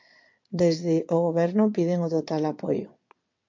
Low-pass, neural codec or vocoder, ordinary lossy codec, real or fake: 7.2 kHz; vocoder, 22.05 kHz, 80 mel bands, WaveNeXt; MP3, 48 kbps; fake